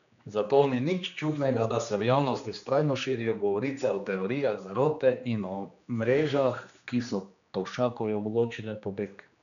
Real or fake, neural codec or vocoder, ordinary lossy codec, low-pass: fake; codec, 16 kHz, 2 kbps, X-Codec, HuBERT features, trained on general audio; none; 7.2 kHz